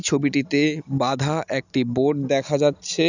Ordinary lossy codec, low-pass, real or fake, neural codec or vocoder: none; 7.2 kHz; real; none